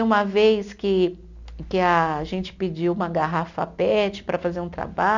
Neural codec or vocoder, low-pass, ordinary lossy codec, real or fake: none; 7.2 kHz; none; real